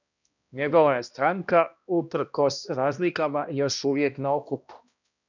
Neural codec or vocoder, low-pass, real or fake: codec, 16 kHz, 1 kbps, X-Codec, HuBERT features, trained on balanced general audio; 7.2 kHz; fake